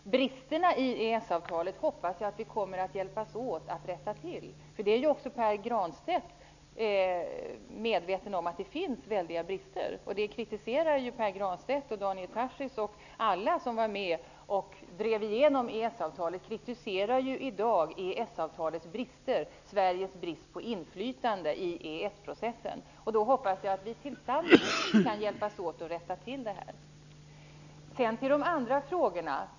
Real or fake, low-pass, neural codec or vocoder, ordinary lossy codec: real; 7.2 kHz; none; none